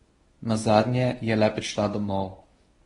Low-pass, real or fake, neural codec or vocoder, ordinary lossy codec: 10.8 kHz; fake; codec, 24 kHz, 0.9 kbps, WavTokenizer, medium speech release version 1; AAC, 32 kbps